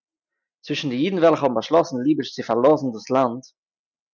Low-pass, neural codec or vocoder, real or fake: 7.2 kHz; none; real